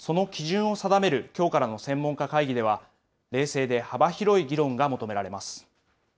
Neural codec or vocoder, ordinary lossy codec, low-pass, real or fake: none; none; none; real